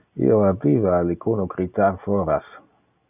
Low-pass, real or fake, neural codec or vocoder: 3.6 kHz; real; none